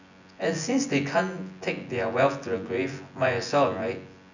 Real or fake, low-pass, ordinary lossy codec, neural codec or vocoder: fake; 7.2 kHz; none; vocoder, 24 kHz, 100 mel bands, Vocos